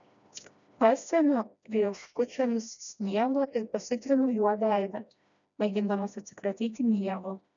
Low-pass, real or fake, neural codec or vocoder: 7.2 kHz; fake; codec, 16 kHz, 1 kbps, FreqCodec, smaller model